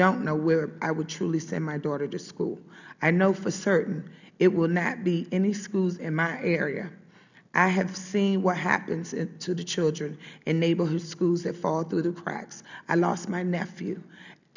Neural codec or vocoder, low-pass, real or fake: none; 7.2 kHz; real